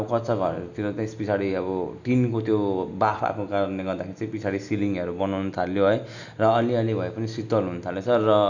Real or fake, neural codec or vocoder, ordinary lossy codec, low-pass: real; none; none; 7.2 kHz